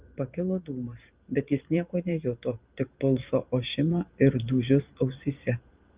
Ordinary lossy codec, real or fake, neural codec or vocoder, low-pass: Opus, 32 kbps; fake; vocoder, 44.1 kHz, 128 mel bands every 512 samples, BigVGAN v2; 3.6 kHz